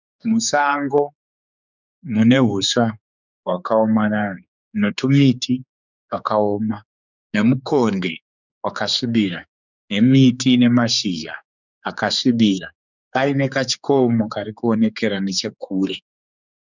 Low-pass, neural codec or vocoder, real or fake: 7.2 kHz; codec, 16 kHz, 4 kbps, X-Codec, HuBERT features, trained on general audio; fake